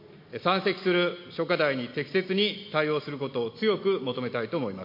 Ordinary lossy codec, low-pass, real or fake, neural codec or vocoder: MP3, 32 kbps; 5.4 kHz; real; none